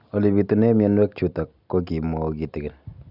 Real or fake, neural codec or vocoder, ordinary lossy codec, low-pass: real; none; none; 5.4 kHz